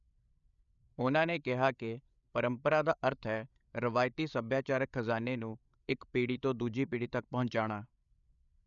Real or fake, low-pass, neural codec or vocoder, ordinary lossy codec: fake; 7.2 kHz; codec, 16 kHz, 8 kbps, FreqCodec, larger model; none